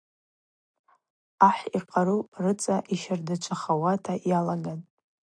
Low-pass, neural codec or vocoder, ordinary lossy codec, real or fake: 9.9 kHz; none; AAC, 64 kbps; real